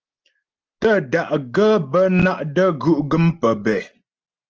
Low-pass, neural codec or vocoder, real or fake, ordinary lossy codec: 7.2 kHz; none; real; Opus, 16 kbps